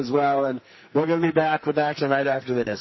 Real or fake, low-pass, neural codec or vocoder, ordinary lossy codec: fake; 7.2 kHz; codec, 44.1 kHz, 2.6 kbps, SNAC; MP3, 24 kbps